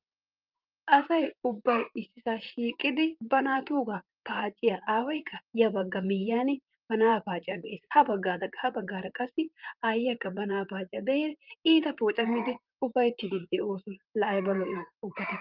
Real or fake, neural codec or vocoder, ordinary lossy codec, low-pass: fake; vocoder, 44.1 kHz, 128 mel bands, Pupu-Vocoder; Opus, 32 kbps; 5.4 kHz